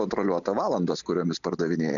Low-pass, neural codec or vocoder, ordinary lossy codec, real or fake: 7.2 kHz; none; MP3, 96 kbps; real